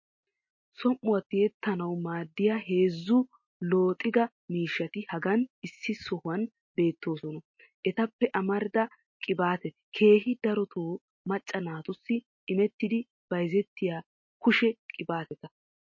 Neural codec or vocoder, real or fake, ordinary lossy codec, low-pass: none; real; MP3, 32 kbps; 7.2 kHz